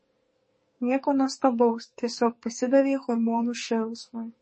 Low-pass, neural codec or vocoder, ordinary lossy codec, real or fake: 9.9 kHz; codec, 44.1 kHz, 2.6 kbps, SNAC; MP3, 32 kbps; fake